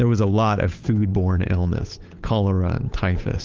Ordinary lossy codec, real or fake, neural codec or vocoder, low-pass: Opus, 32 kbps; fake; codec, 16 kHz, 8 kbps, FunCodec, trained on Chinese and English, 25 frames a second; 7.2 kHz